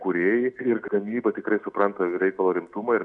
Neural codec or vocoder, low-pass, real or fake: none; 10.8 kHz; real